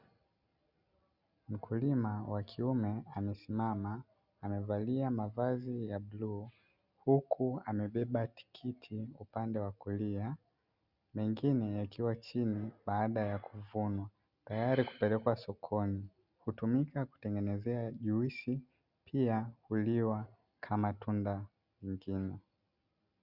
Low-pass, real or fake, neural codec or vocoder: 5.4 kHz; real; none